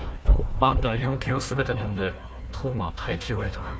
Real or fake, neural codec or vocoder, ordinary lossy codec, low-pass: fake; codec, 16 kHz, 1 kbps, FunCodec, trained on Chinese and English, 50 frames a second; none; none